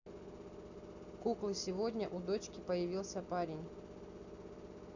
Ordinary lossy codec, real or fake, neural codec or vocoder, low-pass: none; real; none; 7.2 kHz